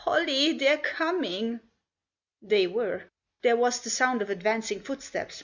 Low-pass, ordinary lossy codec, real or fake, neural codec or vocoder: 7.2 kHz; Opus, 64 kbps; real; none